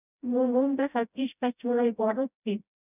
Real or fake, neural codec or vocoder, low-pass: fake; codec, 16 kHz, 0.5 kbps, FreqCodec, smaller model; 3.6 kHz